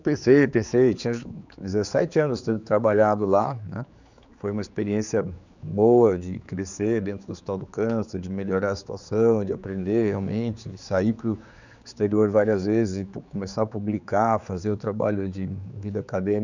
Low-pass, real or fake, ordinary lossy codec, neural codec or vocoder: 7.2 kHz; fake; none; codec, 16 kHz, 4 kbps, X-Codec, HuBERT features, trained on general audio